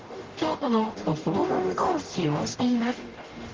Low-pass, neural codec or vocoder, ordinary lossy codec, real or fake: 7.2 kHz; codec, 44.1 kHz, 0.9 kbps, DAC; Opus, 16 kbps; fake